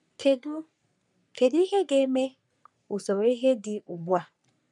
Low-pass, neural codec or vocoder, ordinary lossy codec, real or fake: 10.8 kHz; codec, 44.1 kHz, 3.4 kbps, Pupu-Codec; none; fake